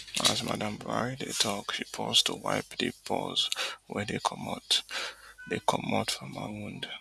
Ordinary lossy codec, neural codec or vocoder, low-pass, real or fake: none; none; none; real